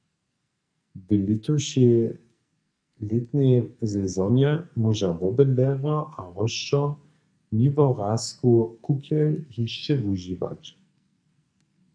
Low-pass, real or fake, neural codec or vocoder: 9.9 kHz; fake; codec, 44.1 kHz, 2.6 kbps, SNAC